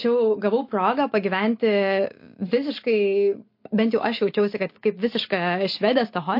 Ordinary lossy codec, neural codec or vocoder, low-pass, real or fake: MP3, 32 kbps; none; 5.4 kHz; real